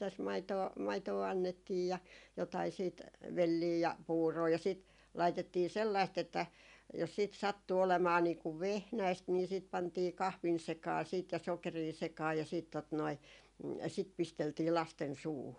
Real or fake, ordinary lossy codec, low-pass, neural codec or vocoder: real; none; 10.8 kHz; none